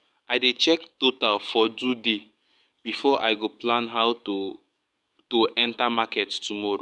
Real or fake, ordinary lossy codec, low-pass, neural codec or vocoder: fake; none; 10.8 kHz; codec, 44.1 kHz, 7.8 kbps, DAC